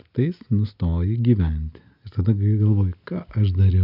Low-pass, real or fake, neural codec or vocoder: 5.4 kHz; real; none